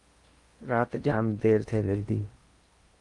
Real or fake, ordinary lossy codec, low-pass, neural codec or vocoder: fake; Opus, 32 kbps; 10.8 kHz; codec, 16 kHz in and 24 kHz out, 0.8 kbps, FocalCodec, streaming, 65536 codes